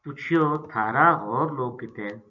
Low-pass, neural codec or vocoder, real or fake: 7.2 kHz; vocoder, 44.1 kHz, 128 mel bands every 256 samples, BigVGAN v2; fake